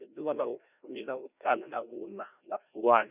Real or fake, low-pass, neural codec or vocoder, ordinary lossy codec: fake; 3.6 kHz; codec, 16 kHz, 1 kbps, FreqCodec, larger model; none